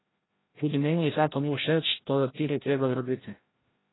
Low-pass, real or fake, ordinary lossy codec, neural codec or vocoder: 7.2 kHz; fake; AAC, 16 kbps; codec, 16 kHz, 0.5 kbps, FreqCodec, larger model